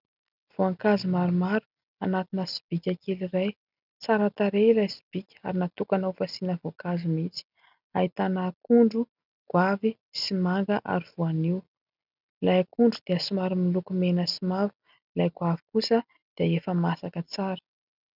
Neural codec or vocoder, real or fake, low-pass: none; real; 5.4 kHz